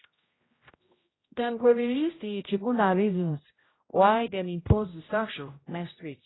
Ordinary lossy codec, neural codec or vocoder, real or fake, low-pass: AAC, 16 kbps; codec, 16 kHz, 0.5 kbps, X-Codec, HuBERT features, trained on general audio; fake; 7.2 kHz